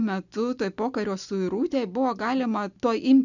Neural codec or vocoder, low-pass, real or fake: none; 7.2 kHz; real